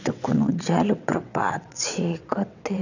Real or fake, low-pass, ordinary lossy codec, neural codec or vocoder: fake; 7.2 kHz; none; vocoder, 22.05 kHz, 80 mel bands, WaveNeXt